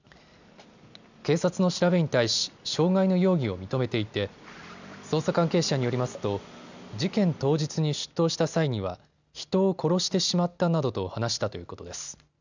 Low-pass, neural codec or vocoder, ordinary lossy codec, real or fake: 7.2 kHz; none; none; real